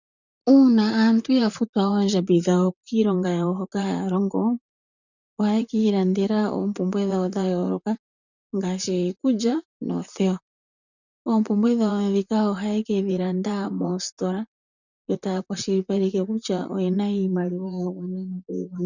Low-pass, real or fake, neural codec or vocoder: 7.2 kHz; fake; vocoder, 44.1 kHz, 128 mel bands, Pupu-Vocoder